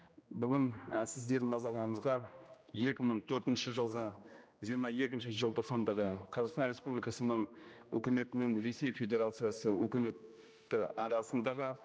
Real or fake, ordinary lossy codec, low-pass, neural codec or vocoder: fake; none; none; codec, 16 kHz, 1 kbps, X-Codec, HuBERT features, trained on general audio